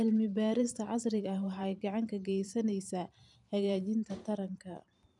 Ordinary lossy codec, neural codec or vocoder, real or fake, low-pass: none; vocoder, 44.1 kHz, 128 mel bands every 512 samples, BigVGAN v2; fake; 10.8 kHz